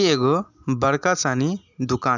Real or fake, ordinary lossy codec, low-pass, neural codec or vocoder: real; none; 7.2 kHz; none